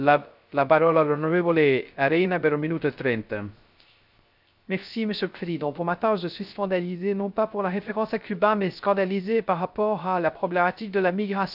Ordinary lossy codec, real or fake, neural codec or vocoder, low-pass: none; fake; codec, 16 kHz, 0.3 kbps, FocalCodec; 5.4 kHz